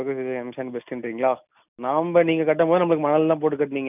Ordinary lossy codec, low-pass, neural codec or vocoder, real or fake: none; 3.6 kHz; none; real